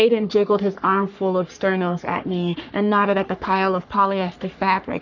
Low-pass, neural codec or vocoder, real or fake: 7.2 kHz; codec, 44.1 kHz, 3.4 kbps, Pupu-Codec; fake